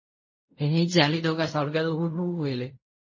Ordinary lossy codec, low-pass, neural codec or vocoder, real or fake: MP3, 32 kbps; 7.2 kHz; codec, 16 kHz in and 24 kHz out, 0.4 kbps, LongCat-Audio-Codec, fine tuned four codebook decoder; fake